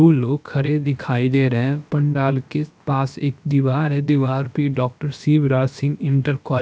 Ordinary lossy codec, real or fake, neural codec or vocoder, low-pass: none; fake; codec, 16 kHz, about 1 kbps, DyCAST, with the encoder's durations; none